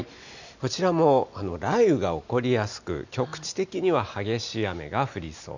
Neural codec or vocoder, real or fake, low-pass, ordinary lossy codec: none; real; 7.2 kHz; none